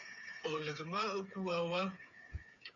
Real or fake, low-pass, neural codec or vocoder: fake; 7.2 kHz; codec, 16 kHz, 8 kbps, FreqCodec, smaller model